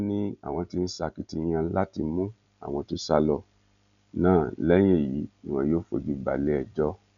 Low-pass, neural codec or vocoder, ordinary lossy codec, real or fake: 7.2 kHz; none; none; real